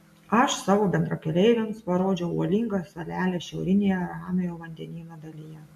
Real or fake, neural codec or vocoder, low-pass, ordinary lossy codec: real; none; 14.4 kHz; MP3, 64 kbps